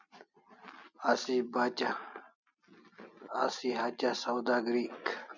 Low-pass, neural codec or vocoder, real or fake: 7.2 kHz; none; real